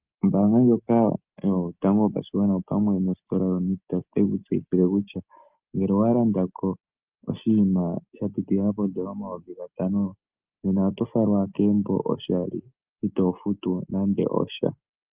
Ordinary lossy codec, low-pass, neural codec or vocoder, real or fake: Opus, 32 kbps; 3.6 kHz; none; real